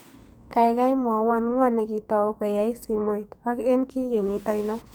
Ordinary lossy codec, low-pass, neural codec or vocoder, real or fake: none; none; codec, 44.1 kHz, 2.6 kbps, SNAC; fake